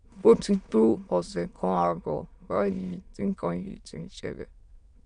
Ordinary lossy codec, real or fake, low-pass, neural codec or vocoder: MP3, 64 kbps; fake; 9.9 kHz; autoencoder, 22.05 kHz, a latent of 192 numbers a frame, VITS, trained on many speakers